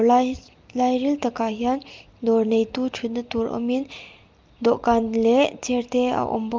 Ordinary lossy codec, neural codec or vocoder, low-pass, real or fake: Opus, 32 kbps; none; 7.2 kHz; real